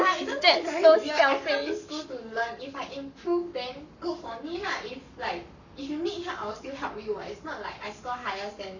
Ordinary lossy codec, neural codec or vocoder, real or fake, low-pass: none; codec, 44.1 kHz, 7.8 kbps, Pupu-Codec; fake; 7.2 kHz